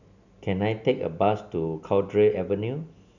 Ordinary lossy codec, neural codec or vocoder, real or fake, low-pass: none; none; real; 7.2 kHz